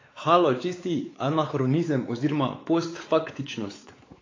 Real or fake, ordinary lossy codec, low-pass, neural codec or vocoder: fake; AAC, 32 kbps; 7.2 kHz; codec, 16 kHz, 4 kbps, X-Codec, WavLM features, trained on Multilingual LibriSpeech